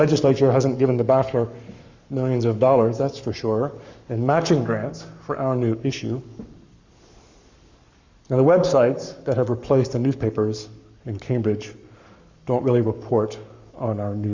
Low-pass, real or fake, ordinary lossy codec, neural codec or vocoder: 7.2 kHz; fake; Opus, 64 kbps; codec, 44.1 kHz, 7.8 kbps, Pupu-Codec